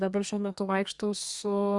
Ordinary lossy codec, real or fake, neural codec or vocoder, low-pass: Opus, 64 kbps; fake; codec, 32 kHz, 1.9 kbps, SNAC; 10.8 kHz